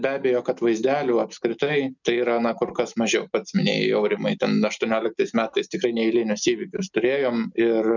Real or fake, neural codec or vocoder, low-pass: real; none; 7.2 kHz